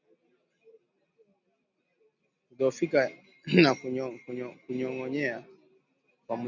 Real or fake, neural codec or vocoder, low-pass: real; none; 7.2 kHz